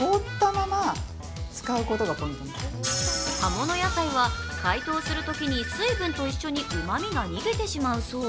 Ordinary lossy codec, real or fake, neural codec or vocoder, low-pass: none; real; none; none